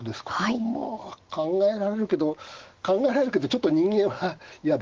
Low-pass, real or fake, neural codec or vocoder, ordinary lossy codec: 7.2 kHz; fake; autoencoder, 48 kHz, 128 numbers a frame, DAC-VAE, trained on Japanese speech; Opus, 16 kbps